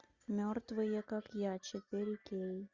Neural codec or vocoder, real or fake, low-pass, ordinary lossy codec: none; real; 7.2 kHz; Opus, 64 kbps